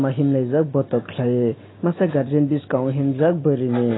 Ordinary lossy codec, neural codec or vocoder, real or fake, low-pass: AAC, 16 kbps; none; real; 7.2 kHz